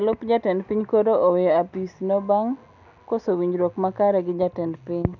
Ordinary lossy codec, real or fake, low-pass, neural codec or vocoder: none; real; 7.2 kHz; none